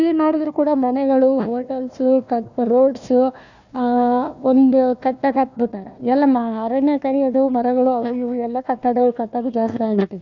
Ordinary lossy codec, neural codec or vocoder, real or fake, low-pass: none; codec, 16 kHz, 1 kbps, FunCodec, trained on Chinese and English, 50 frames a second; fake; 7.2 kHz